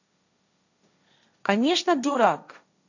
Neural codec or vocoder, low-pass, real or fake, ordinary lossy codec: codec, 16 kHz, 1.1 kbps, Voila-Tokenizer; 7.2 kHz; fake; none